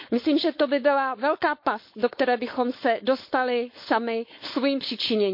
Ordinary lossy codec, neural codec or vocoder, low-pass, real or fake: MP3, 32 kbps; codec, 16 kHz, 4.8 kbps, FACodec; 5.4 kHz; fake